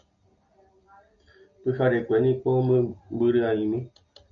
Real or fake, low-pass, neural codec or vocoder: real; 7.2 kHz; none